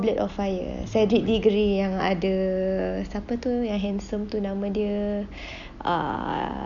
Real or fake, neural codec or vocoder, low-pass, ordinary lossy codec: real; none; 7.2 kHz; none